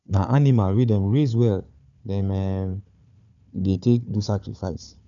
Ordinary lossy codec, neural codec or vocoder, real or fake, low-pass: none; codec, 16 kHz, 4 kbps, FunCodec, trained on Chinese and English, 50 frames a second; fake; 7.2 kHz